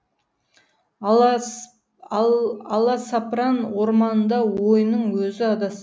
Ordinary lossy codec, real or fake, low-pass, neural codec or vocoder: none; real; none; none